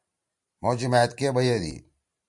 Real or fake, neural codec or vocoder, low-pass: fake; vocoder, 44.1 kHz, 128 mel bands every 512 samples, BigVGAN v2; 10.8 kHz